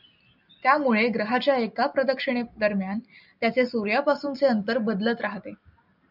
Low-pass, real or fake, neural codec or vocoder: 5.4 kHz; real; none